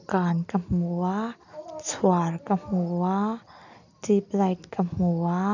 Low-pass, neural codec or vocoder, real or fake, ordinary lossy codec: 7.2 kHz; none; real; none